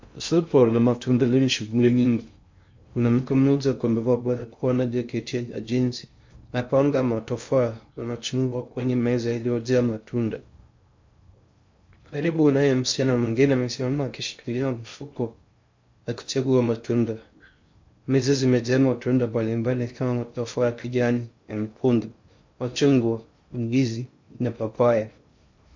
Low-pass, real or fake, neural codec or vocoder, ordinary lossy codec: 7.2 kHz; fake; codec, 16 kHz in and 24 kHz out, 0.6 kbps, FocalCodec, streaming, 2048 codes; MP3, 48 kbps